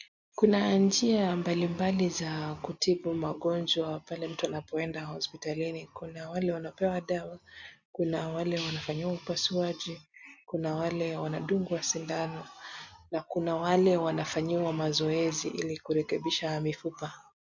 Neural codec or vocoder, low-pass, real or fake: none; 7.2 kHz; real